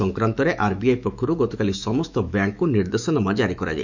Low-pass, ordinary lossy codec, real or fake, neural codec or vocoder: 7.2 kHz; none; fake; autoencoder, 48 kHz, 128 numbers a frame, DAC-VAE, trained on Japanese speech